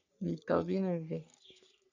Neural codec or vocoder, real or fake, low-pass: codec, 44.1 kHz, 3.4 kbps, Pupu-Codec; fake; 7.2 kHz